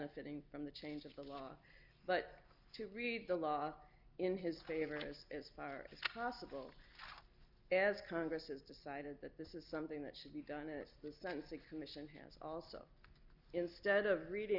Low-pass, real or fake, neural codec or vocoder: 5.4 kHz; real; none